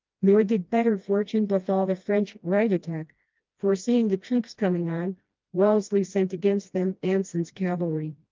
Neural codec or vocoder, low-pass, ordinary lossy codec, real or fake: codec, 16 kHz, 1 kbps, FreqCodec, smaller model; 7.2 kHz; Opus, 24 kbps; fake